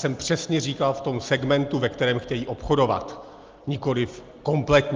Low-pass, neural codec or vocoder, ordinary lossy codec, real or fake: 7.2 kHz; none; Opus, 32 kbps; real